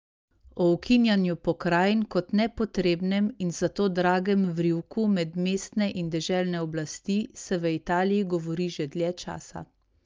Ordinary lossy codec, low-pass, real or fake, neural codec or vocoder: Opus, 24 kbps; 7.2 kHz; real; none